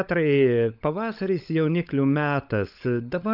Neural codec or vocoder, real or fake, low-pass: codec, 16 kHz, 16 kbps, FunCodec, trained on LibriTTS, 50 frames a second; fake; 5.4 kHz